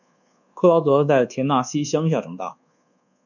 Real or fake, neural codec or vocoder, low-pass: fake; codec, 24 kHz, 1.2 kbps, DualCodec; 7.2 kHz